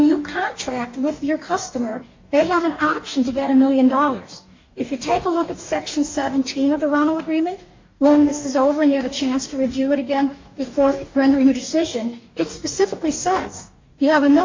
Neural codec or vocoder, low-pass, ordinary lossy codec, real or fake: codec, 44.1 kHz, 2.6 kbps, DAC; 7.2 kHz; MP3, 48 kbps; fake